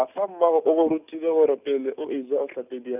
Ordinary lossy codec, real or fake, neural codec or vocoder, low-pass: none; real; none; 3.6 kHz